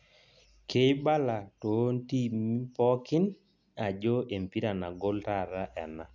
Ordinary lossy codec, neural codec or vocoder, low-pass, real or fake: none; none; 7.2 kHz; real